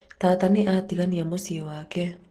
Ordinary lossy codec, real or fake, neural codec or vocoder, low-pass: Opus, 16 kbps; real; none; 14.4 kHz